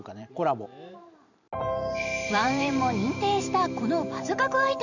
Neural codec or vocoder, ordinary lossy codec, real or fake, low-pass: vocoder, 44.1 kHz, 128 mel bands every 512 samples, BigVGAN v2; none; fake; 7.2 kHz